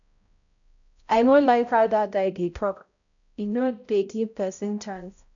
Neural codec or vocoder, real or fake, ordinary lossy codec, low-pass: codec, 16 kHz, 0.5 kbps, X-Codec, HuBERT features, trained on balanced general audio; fake; none; 7.2 kHz